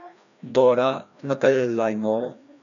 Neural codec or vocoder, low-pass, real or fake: codec, 16 kHz, 1 kbps, FreqCodec, larger model; 7.2 kHz; fake